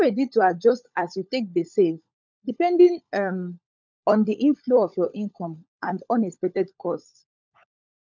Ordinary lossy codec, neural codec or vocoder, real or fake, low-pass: none; codec, 16 kHz, 16 kbps, FunCodec, trained on LibriTTS, 50 frames a second; fake; 7.2 kHz